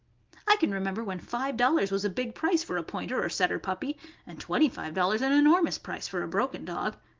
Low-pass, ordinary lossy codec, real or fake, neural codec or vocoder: 7.2 kHz; Opus, 32 kbps; real; none